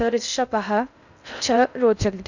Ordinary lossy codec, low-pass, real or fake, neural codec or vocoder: none; 7.2 kHz; fake; codec, 16 kHz in and 24 kHz out, 0.6 kbps, FocalCodec, streaming, 2048 codes